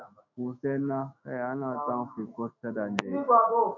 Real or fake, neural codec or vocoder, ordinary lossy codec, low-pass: fake; autoencoder, 48 kHz, 128 numbers a frame, DAC-VAE, trained on Japanese speech; MP3, 64 kbps; 7.2 kHz